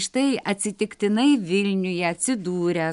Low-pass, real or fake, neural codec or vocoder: 10.8 kHz; real; none